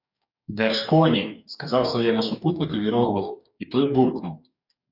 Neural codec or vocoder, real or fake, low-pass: codec, 44.1 kHz, 2.6 kbps, DAC; fake; 5.4 kHz